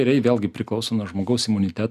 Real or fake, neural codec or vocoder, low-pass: real; none; 14.4 kHz